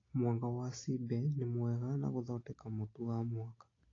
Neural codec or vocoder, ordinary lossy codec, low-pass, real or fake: none; AAC, 32 kbps; 7.2 kHz; real